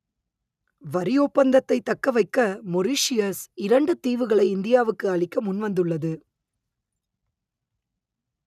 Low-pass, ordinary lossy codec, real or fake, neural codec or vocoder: 14.4 kHz; none; real; none